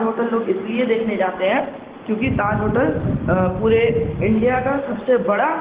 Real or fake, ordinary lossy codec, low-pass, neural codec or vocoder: real; Opus, 16 kbps; 3.6 kHz; none